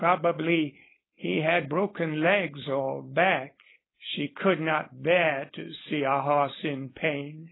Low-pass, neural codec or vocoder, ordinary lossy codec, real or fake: 7.2 kHz; codec, 16 kHz, 4.8 kbps, FACodec; AAC, 16 kbps; fake